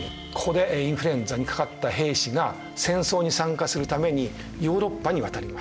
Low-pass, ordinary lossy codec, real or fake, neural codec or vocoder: none; none; real; none